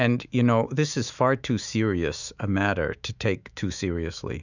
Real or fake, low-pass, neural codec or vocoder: fake; 7.2 kHz; autoencoder, 48 kHz, 128 numbers a frame, DAC-VAE, trained on Japanese speech